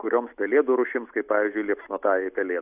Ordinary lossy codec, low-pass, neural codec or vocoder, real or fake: AAC, 32 kbps; 3.6 kHz; none; real